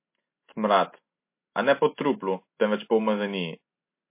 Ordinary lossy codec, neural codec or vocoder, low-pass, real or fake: MP3, 24 kbps; none; 3.6 kHz; real